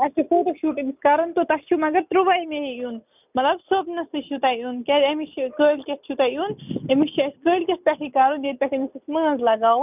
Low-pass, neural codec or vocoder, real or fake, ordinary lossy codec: 3.6 kHz; none; real; none